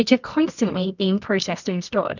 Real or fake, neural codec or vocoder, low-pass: fake; codec, 24 kHz, 0.9 kbps, WavTokenizer, medium music audio release; 7.2 kHz